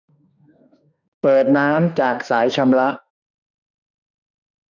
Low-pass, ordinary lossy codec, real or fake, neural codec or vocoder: 7.2 kHz; none; fake; autoencoder, 48 kHz, 32 numbers a frame, DAC-VAE, trained on Japanese speech